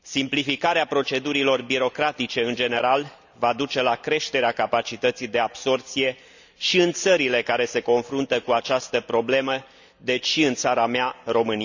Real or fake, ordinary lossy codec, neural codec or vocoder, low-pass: real; none; none; 7.2 kHz